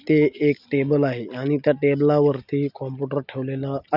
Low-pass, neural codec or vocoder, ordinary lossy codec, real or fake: 5.4 kHz; none; none; real